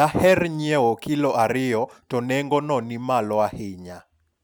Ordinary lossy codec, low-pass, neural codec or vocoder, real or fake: none; none; none; real